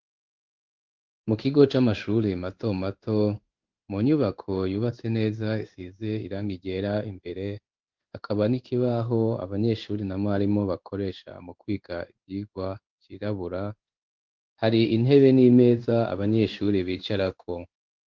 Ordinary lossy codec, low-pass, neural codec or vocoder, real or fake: Opus, 32 kbps; 7.2 kHz; codec, 16 kHz in and 24 kHz out, 1 kbps, XY-Tokenizer; fake